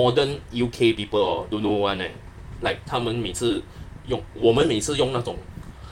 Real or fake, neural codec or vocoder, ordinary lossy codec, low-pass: fake; vocoder, 44.1 kHz, 128 mel bands, Pupu-Vocoder; MP3, 96 kbps; 19.8 kHz